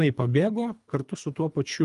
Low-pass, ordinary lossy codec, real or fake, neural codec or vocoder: 10.8 kHz; Opus, 32 kbps; fake; codec, 24 kHz, 3 kbps, HILCodec